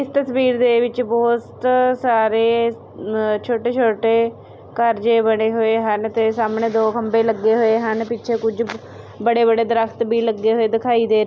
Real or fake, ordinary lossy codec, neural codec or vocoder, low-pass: real; none; none; none